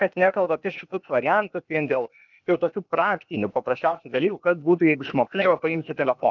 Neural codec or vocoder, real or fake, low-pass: codec, 16 kHz, 0.8 kbps, ZipCodec; fake; 7.2 kHz